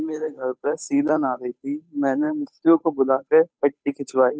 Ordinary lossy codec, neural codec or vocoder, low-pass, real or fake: none; codec, 16 kHz, 8 kbps, FunCodec, trained on Chinese and English, 25 frames a second; none; fake